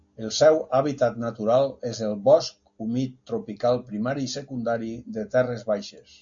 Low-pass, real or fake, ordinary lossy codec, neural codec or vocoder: 7.2 kHz; real; AAC, 64 kbps; none